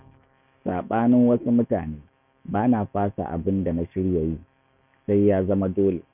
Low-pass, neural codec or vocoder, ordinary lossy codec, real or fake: 3.6 kHz; autoencoder, 48 kHz, 128 numbers a frame, DAC-VAE, trained on Japanese speech; none; fake